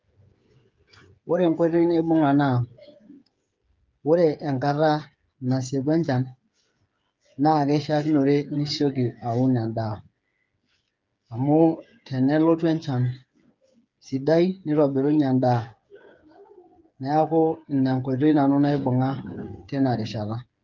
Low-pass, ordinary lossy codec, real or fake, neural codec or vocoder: 7.2 kHz; Opus, 24 kbps; fake; codec, 16 kHz, 8 kbps, FreqCodec, smaller model